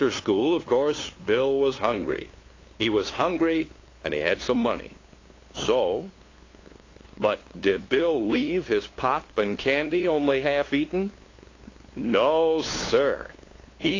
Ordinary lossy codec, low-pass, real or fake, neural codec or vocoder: AAC, 32 kbps; 7.2 kHz; fake; codec, 16 kHz, 2 kbps, FunCodec, trained on LibriTTS, 25 frames a second